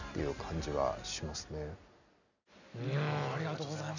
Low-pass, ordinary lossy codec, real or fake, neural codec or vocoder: 7.2 kHz; none; real; none